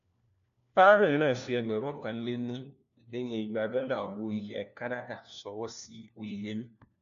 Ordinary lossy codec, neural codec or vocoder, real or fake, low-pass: MP3, 64 kbps; codec, 16 kHz, 1 kbps, FunCodec, trained on LibriTTS, 50 frames a second; fake; 7.2 kHz